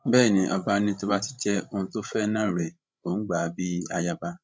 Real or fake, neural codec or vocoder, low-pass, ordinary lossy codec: fake; codec, 16 kHz, 16 kbps, FreqCodec, larger model; none; none